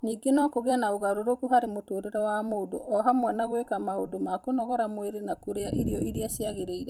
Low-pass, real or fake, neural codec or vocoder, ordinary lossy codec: 19.8 kHz; fake; vocoder, 44.1 kHz, 128 mel bands every 256 samples, BigVGAN v2; none